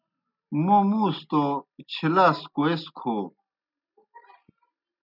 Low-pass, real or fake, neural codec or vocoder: 5.4 kHz; real; none